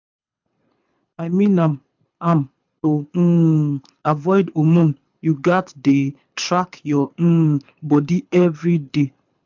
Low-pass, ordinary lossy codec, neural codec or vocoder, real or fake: 7.2 kHz; MP3, 64 kbps; codec, 24 kHz, 6 kbps, HILCodec; fake